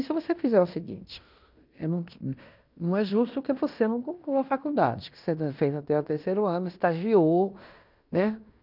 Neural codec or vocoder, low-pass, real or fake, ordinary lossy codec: codec, 16 kHz in and 24 kHz out, 0.9 kbps, LongCat-Audio-Codec, fine tuned four codebook decoder; 5.4 kHz; fake; none